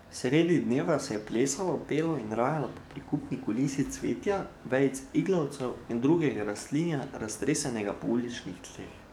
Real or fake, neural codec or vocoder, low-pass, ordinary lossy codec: fake; codec, 44.1 kHz, 7.8 kbps, DAC; 19.8 kHz; none